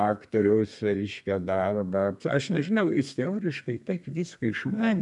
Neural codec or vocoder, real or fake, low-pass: codec, 32 kHz, 1.9 kbps, SNAC; fake; 10.8 kHz